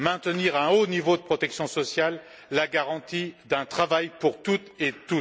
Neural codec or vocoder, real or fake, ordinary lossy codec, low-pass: none; real; none; none